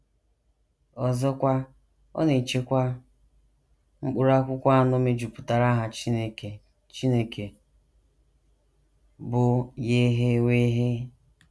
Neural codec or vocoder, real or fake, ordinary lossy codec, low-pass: none; real; none; none